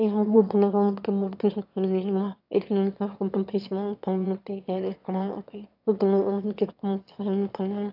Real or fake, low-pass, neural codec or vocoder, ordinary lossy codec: fake; 5.4 kHz; autoencoder, 22.05 kHz, a latent of 192 numbers a frame, VITS, trained on one speaker; AAC, 48 kbps